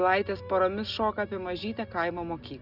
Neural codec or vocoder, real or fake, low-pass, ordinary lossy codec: none; real; 5.4 kHz; Opus, 64 kbps